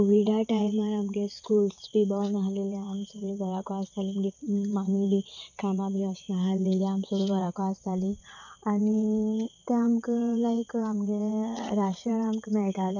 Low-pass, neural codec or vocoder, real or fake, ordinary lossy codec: 7.2 kHz; vocoder, 22.05 kHz, 80 mel bands, WaveNeXt; fake; none